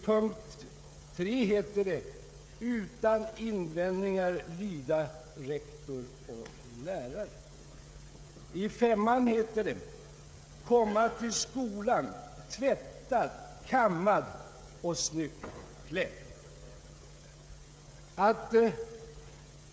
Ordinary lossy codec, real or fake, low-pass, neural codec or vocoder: none; fake; none; codec, 16 kHz, 16 kbps, FreqCodec, smaller model